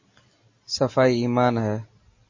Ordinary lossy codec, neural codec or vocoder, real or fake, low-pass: MP3, 32 kbps; none; real; 7.2 kHz